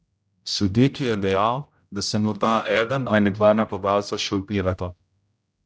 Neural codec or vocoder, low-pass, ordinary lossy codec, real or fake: codec, 16 kHz, 0.5 kbps, X-Codec, HuBERT features, trained on general audio; none; none; fake